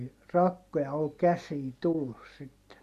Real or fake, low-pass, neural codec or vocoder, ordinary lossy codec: real; 14.4 kHz; none; MP3, 64 kbps